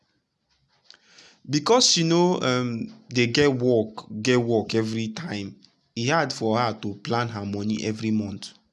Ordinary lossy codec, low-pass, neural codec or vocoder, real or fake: none; none; none; real